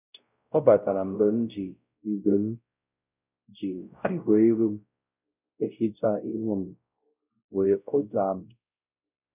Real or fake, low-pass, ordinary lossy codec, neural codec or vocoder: fake; 3.6 kHz; none; codec, 16 kHz, 0.5 kbps, X-Codec, WavLM features, trained on Multilingual LibriSpeech